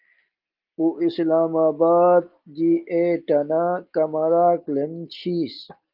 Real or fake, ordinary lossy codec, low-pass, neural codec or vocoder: real; Opus, 32 kbps; 5.4 kHz; none